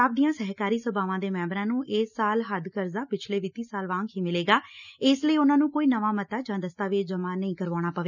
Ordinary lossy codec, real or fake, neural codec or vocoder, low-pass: none; real; none; 7.2 kHz